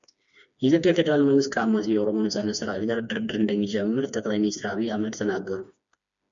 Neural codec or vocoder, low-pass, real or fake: codec, 16 kHz, 2 kbps, FreqCodec, smaller model; 7.2 kHz; fake